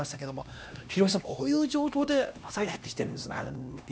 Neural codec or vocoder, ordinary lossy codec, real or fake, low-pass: codec, 16 kHz, 1 kbps, X-Codec, HuBERT features, trained on LibriSpeech; none; fake; none